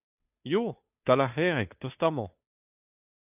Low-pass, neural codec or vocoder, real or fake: 3.6 kHz; codec, 16 kHz, 2 kbps, FunCodec, trained on Chinese and English, 25 frames a second; fake